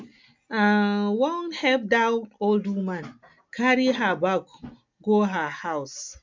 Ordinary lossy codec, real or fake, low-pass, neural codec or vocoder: MP3, 64 kbps; real; 7.2 kHz; none